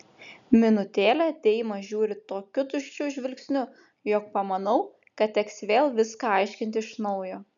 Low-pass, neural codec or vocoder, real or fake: 7.2 kHz; none; real